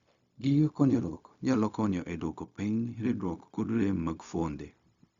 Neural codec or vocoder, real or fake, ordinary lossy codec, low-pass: codec, 16 kHz, 0.4 kbps, LongCat-Audio-Codec; fake; Opus, 64 kbps; 7.2 kHz